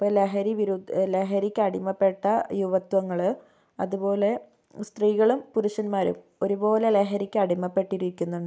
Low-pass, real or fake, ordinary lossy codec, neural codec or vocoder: none; real; none; none